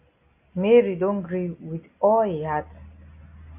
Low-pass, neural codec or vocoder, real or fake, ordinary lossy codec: 3.6 kHz; none; real; AAC, 24 kbps